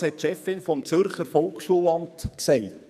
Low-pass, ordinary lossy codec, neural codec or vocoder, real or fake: 14.4 kHz; none; codec, 44.1 kHz, 2.6 kbps, SNAC; fake